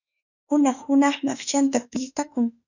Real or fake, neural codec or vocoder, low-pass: fake; autoencoder, 48 kHz, 32 numbers a frame, DAC-VAE, trained on Japanese speech; 7.2 kHz